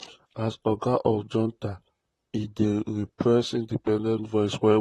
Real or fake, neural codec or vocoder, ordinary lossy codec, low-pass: fake; vocoder, 44.1 kHz, 128 mel bands, Pupu-Vocoder; AAC, 32 kbps; 19.8 kHz